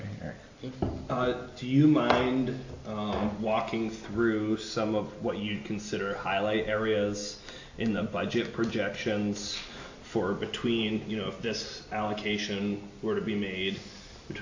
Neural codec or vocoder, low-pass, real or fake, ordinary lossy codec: none; 7.2 kHz; real; AAC, 48 kbps